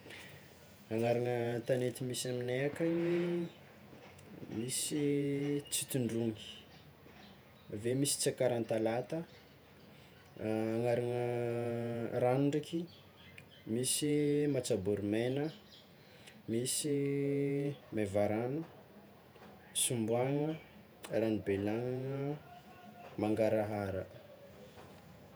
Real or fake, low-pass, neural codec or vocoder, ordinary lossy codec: fake; none; vocoder, 48 kHz, 128 mel bands, Vocos; none